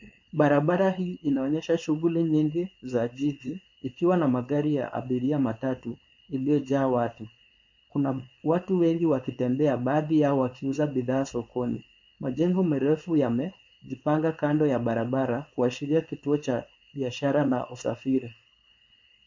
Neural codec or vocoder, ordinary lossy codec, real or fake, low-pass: codec, 16 kHz, 4.8 kbps, FACodec; MP3, 48 kbps; fake; 7.2 kHz